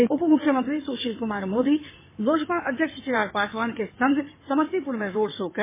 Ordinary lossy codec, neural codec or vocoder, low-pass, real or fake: MP3, 16 kbps; codec, 16 kHz in and 24 kHz out, 2.2 kbps, FireRedTTS-2 codec; 3.6 kHz; fake